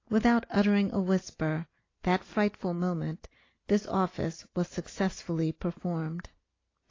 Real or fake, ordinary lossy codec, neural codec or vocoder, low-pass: real; AAC, 32 kbps; none; 7.2 kHz